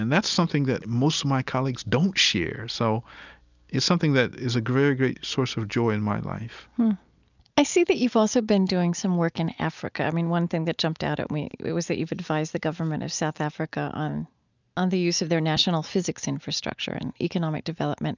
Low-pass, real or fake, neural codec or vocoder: 7.2 kHz; real; none